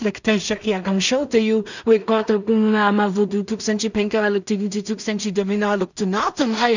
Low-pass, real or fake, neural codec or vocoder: 7.2 kHz; fake; codec, 16 kHz in and 24 kHz out, 0.4 kbps, LongCat-Audio-Codec, two codebook decoder